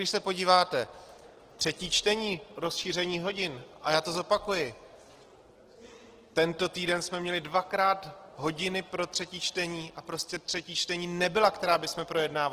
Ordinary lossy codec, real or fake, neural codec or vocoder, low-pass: Opus, 16 kbps; real; none; 14.4 kHz